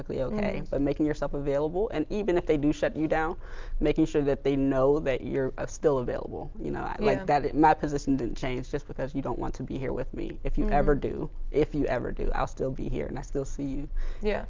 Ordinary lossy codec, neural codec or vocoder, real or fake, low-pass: Opus, 24 kbps; none; real; 7.2 kHz